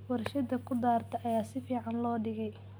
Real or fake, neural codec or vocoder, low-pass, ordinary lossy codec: real; none; none; none